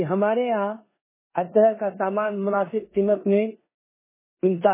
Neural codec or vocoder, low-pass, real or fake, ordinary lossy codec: codec, 16 kHz in and 24 kHz out, 0.9 kbps, LongCat-Audio-Codec, four codebook decoder; 3.6 kHz; fake; MP3, 16 kbps